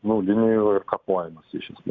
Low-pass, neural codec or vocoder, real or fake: 7.2 kHz; none; real